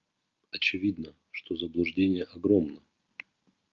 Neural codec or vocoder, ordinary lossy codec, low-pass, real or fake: none; Opus, 24 kbps; 7.2 kHz; real